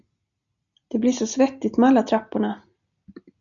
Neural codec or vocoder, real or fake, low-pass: none; real; 7.2 kHz